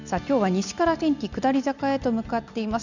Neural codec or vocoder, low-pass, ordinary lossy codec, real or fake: none; 7.2 kHz; none; real